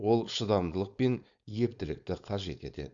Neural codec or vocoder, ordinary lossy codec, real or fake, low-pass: codec, 16 kHz, 4.8 kbps, FACodec; Opus, 64 kbps; fake; 7.2 kHz